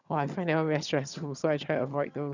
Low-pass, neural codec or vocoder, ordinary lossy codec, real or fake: 7.2 kHz; vocoder, 22.05 kHz, 80 mel bands, HiFi-GAN; none; fake